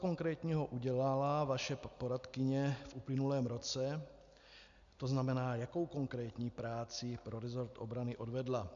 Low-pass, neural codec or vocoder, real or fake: 7.2 kHz; none; real